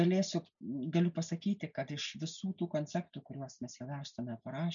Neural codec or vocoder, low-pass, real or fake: none; 7.2 kHz; real